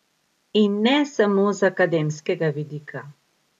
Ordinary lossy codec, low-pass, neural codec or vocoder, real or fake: none; 14.4 kHz; none; real